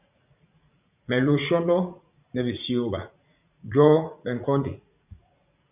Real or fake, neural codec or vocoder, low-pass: fake; vocoder, 44.1 kHz, 80 mel bands, Vocos; 3.6 kHz